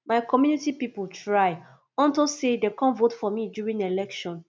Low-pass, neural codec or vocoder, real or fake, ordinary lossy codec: none; none; real; none